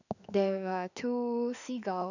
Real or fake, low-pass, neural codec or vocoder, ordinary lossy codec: fake; 7.2 kHz; autoencoder, 48 kHz, 32 numbers a frame, DAC-VAE, trained on Japanese speech; none